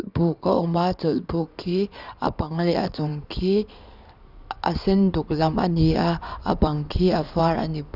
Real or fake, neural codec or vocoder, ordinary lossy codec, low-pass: fake; codec, 16 kHz in and 24 kHz out, 2.2 kbps, FireRedTTS-2 codec; none; 5.4 kHz